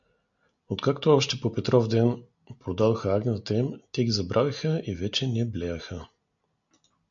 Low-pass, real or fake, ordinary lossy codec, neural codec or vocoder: 7.2 kHz; real; MP3, 64 kbps; none